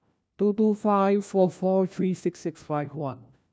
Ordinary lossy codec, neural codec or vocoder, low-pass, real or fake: none; codec, 16 kHz, 1 kbps, FunCodec, trained on LibriTTS, 50 frames a second; none; fake